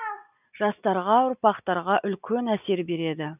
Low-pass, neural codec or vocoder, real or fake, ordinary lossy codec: 3.6 kHz; none; real; none